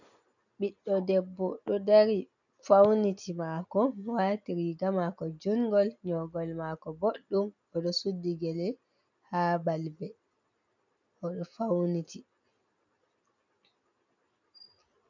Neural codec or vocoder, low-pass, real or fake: none; 7.2 kHz; real